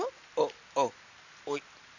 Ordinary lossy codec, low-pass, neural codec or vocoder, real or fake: none; 7.2 kHz; none; real